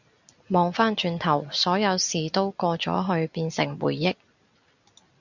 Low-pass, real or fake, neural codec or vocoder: 7.2 kHz; real; none